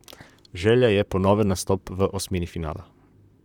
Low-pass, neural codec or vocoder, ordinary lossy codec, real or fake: 19.8 kHz; vocoder, 44.1 kHz, 128 mel bands, Pupu-Vocoder; none; fake